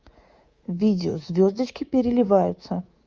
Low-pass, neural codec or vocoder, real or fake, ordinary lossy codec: 7.2 kHz; codec, 24 kHz, 3.1 kbps, DualCodec; fake; Opus, 32 kbps